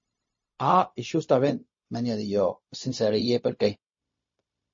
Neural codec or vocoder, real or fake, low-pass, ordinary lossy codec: codec, 16 kHz, 0.4 kbps, LongCat-Audio-Codec; fake; 7.2 kHz; MP3, 32 kbps